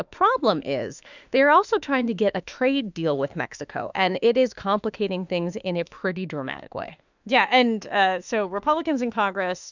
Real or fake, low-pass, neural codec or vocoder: fake; 7.2 kHz; autoencoder, 48 kHz, 32 numbers a frame, DAC-VAE, trained on Japanese speech